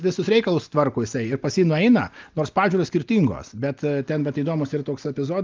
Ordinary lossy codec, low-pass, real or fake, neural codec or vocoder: Opus, 24 kbps; 7.2 kHz; real; none